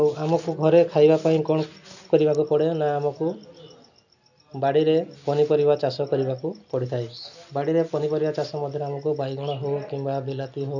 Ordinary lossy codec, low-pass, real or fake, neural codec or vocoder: none; 7.2 kHz; real; none